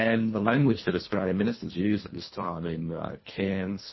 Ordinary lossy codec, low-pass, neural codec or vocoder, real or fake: MP3, 24 kbps; 7.2 kHz; codec, 24 kHz, 1.5 kbps, HILCodec; fake